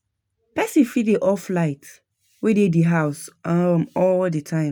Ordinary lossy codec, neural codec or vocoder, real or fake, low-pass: none; none; real; none